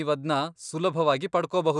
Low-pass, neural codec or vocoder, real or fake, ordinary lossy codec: 10.8 kHz; none; real; none